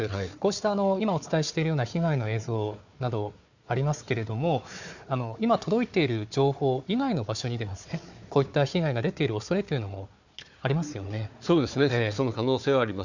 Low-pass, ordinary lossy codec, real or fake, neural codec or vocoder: 7.2 kHz; none; fake; codec, 16 kHz, 4 kbps, FunCodec, trained on Chinese and English, 50 frames a second